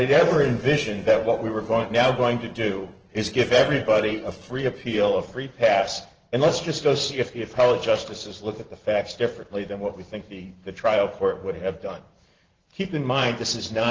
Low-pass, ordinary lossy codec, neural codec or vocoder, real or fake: 7.2 kHz; Opus, 16 kbps; vocoder, 44.1 kHz, 128 mel bands every 512 samples, BigVGAN v2; fake